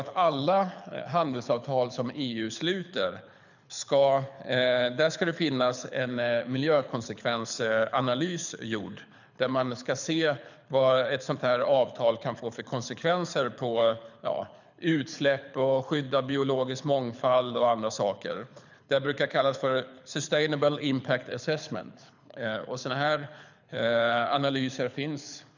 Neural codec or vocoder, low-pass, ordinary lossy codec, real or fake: codec, 24 kHz, 6 kbps, HILCodec; 7.2 kHz; none; fake